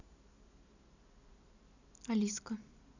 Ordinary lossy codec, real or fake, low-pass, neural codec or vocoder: none; real; 7.2 kHz; none